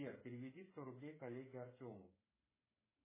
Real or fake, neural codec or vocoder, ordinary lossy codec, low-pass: fake; codec, 16 kHz, 8 kbps, FreqCodec, smaller model; MP3, 16 kbps; 3.6 kHz